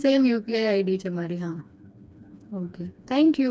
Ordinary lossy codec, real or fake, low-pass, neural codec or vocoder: none; fake; none; codec, 16 kHz, 2 kbps, FreqCodec, smaller model